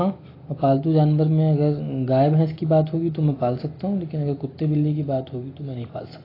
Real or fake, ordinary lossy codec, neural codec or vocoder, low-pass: real; AAC, 24 kbps; none; 5.4 kHz